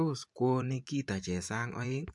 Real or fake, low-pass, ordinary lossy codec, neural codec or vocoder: fake; 10.8 kHz; MP3, 64 kbps; vocoder, 44.1 kHz, 128 mel bands every 512 samples, BigVGAN v2